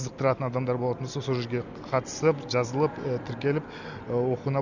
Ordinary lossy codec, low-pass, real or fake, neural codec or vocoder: none; 7.2 kHz; real; none